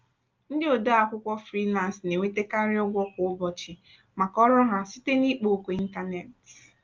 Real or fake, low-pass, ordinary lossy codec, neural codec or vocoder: real; 7.2 kHz; Opus, 24 kbps; none